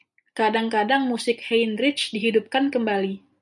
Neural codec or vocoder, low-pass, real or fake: none; 10.8 kHz; real